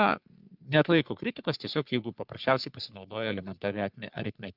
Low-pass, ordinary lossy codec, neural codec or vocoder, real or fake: 5.4 kHz; Opus, 24 kbps; codec, 44.1 kHz, 3.4 kbps, Pupu-Codec; fake